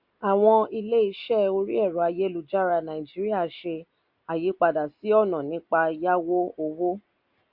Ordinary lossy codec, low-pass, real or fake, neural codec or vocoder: MP3, 48 kbps; 5.4 kHz; real; none